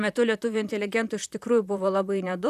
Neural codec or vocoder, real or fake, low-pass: vocoder, 44.1 kHz, 128 mel bands, Pupu-Vocoder; fake; 14.4 kHz